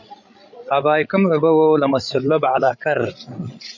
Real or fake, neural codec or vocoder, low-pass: fake; codec, 16 kHz, 16 kbps, FreqCodec, larger model; 7.2 kHz